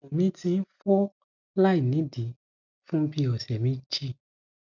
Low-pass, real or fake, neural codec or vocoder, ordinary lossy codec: 7.2 kHz; real; none; none